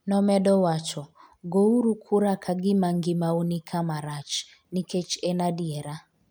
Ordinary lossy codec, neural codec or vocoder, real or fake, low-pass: none; none; real; none